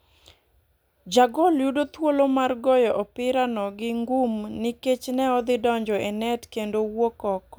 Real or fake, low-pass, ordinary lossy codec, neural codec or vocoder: real; none; none; none